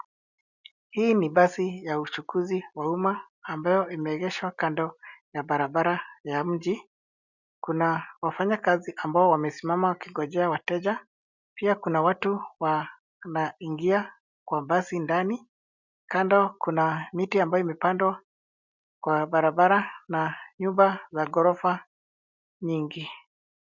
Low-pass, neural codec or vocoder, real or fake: 7.2 kHz; none; real